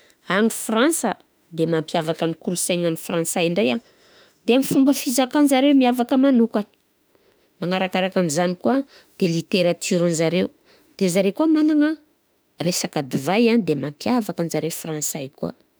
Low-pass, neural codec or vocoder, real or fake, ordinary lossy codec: none; autoencoder, 48 kHz, 32 numbers a frame, DAC-VAE, trained on Japanese speech; fake; none